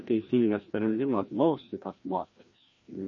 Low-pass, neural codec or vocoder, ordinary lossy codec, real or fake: 7.2 kHz; codec, 16 kHz, 1 kbps, FreqCodec, larger model; MP3, 32 kbps; fake